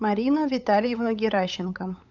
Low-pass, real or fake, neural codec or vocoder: 7.2 kHz; fake; codec, 16 kHz, 8 kbps, FunCodec, trained on LibriTTS, 25 frames a second